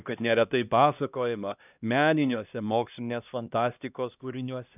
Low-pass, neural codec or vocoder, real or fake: 3.6 kHz; codec, 16 kHz, 1 kbps, X-Codec, HuBERT features, trained on LibriSpeech; fake